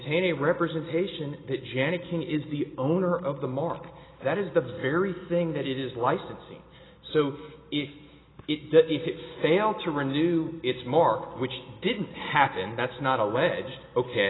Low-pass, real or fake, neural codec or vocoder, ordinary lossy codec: 7.2 kHz; real; none; AAC, 16 kbps